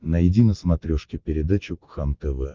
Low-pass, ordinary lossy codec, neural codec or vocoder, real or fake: 7.2 kHz; Opus, 24 kbps; none; real